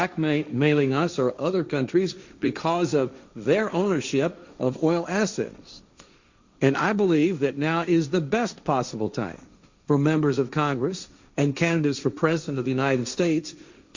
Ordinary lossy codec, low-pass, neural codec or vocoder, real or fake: Opus, 64 kbps; 7.2 kHz; codec, 16 kHz, 1.1 kbps, Voila-Tokenizer; fake